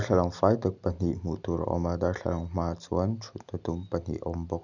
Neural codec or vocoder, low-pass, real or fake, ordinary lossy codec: none; 7.2 kHz; real; none